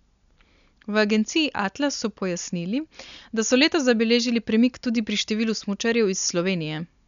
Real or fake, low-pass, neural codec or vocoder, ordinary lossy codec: real; 7.2 kHz; none; none